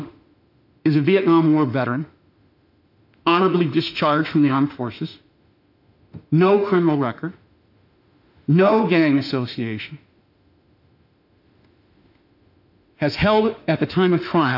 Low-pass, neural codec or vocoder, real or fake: 5.4 kHz; autoencoder, 48 kHz, 32 numbers a frame, DAC-VAE, trained on Japanese speech; fake